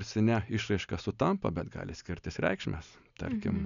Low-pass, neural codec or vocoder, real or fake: 7.2 kHz; none; real